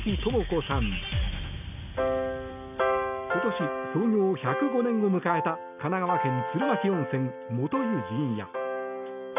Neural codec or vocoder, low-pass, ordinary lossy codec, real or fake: none; 3.6 kHz; none; real